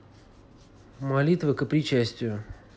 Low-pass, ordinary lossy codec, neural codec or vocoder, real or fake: none; none; none; real